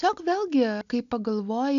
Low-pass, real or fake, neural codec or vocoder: 7.2 kHz; real; none